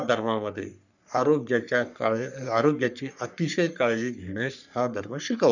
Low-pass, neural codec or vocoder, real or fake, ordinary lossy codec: 7.2 kHz; codec, 44.1 kHz, 3.4 kbps, Pupu-Codec; fake; none